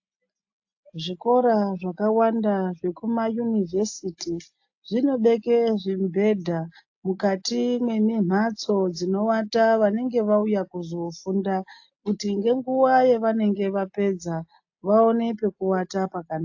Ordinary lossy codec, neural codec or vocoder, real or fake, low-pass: AAC, 48 kbps; none; real; 7.2 kHz